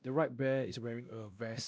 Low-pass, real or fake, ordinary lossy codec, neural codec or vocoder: none; fake; none; codec, 16 kHz, 1 kbps, X-Codec, WavLM features, trained on Multilingual LibriSpeech